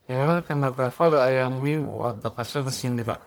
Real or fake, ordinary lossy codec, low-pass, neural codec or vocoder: fake; none; none; codec, 44.1 kHz, 1.7 kbps, Pupu-Codec